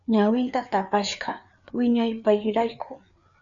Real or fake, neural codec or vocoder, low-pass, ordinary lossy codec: fake; codec, 16 kHz, 4 kbps, FreqCodec, larger model; 7.2 kHz; MP3, 96 kbps